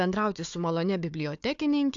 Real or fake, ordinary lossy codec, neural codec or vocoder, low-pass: fake; AAC, 64 kbps; codec, 16 kHz, 4 kbps, FunCodec, trained on Chinese and English, 50 frames a second; 7.2 kHz